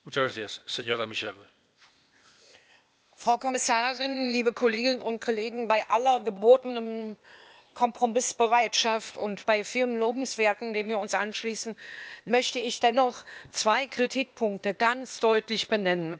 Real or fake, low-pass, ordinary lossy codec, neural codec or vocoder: fake; none; none; codec, 16 kHz, 0.8 kbps, ZipCodec